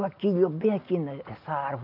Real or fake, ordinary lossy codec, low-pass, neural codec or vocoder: real; none; 5.4 kHz; none